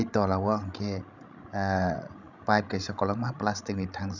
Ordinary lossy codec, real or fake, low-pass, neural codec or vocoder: none; fake; 7.2 kHz; codec, 16 kHz, 16 kbps, FreqCodec, larger model